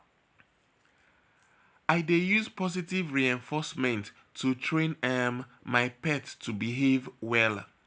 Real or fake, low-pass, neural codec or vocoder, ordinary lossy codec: real; none; none; none